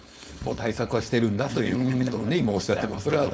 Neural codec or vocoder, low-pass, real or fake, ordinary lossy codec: codec, 16 kHz, 4.8 kbps, FACodec; none; fake; none